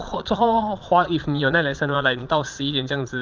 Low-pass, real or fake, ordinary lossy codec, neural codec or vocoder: 7.2 kHz; fake; Opus, 32 kbps; vocoder, 22.05 kHz, 80 mel bands, Vocos